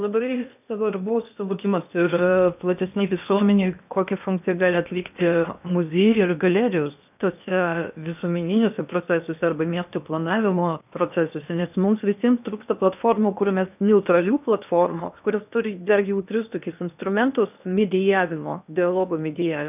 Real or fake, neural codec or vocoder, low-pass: fake; codec, 16 kHz in and 24 kHz out, 0.8 kbps, FocalCodec, streaming, 65536 codes; 3.6 kHz